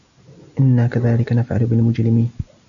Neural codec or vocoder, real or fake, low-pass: none; real; 7.2 kHz